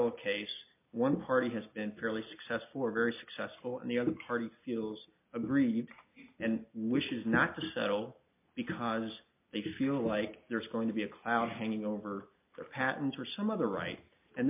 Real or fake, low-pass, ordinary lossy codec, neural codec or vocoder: real; 3.6 kHz; MP3, 32 kbps; none